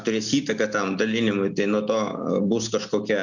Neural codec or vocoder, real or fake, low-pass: none; real; 7.2 kHz